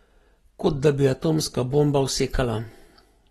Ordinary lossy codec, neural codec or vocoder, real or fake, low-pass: AAC, 32 kbps; none; real; 19.8 kHz